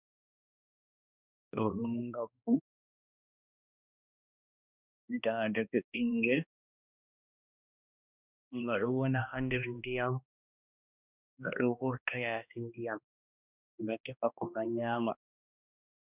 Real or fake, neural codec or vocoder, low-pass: fake; codec, 16 kHz, 1 kbps, X-Codec, HuBERT features, trained on balanced general audio; 3.6 kHz